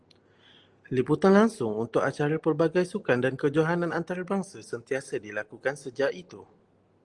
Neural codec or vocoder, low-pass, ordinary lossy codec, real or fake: none; 10.8 kHz; Opus, 24 kbps; real